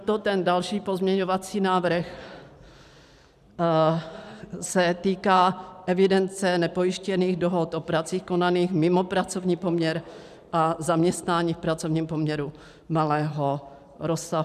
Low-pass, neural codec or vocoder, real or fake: 14.4 kHz; vocoder, 44.1 kHz, 128 mel bands every 512 samples, BigVGAN v2; fake